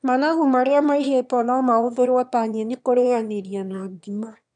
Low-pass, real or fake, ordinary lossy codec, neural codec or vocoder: 9.9 kHz; fake; none; autoencoder, 22.05 kHz, a latent of 192 numbers a frame, VITS, trained on one speaker